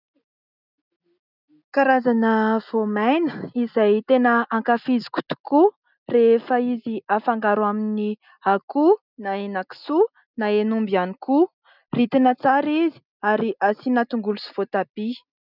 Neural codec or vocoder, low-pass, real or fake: none; 5.4 kHz; real